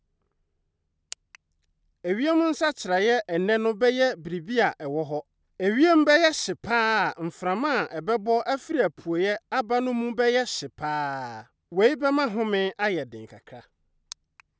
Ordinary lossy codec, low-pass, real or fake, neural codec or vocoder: none; none; real; none